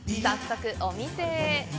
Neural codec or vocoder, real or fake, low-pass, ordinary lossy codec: none; real; none; none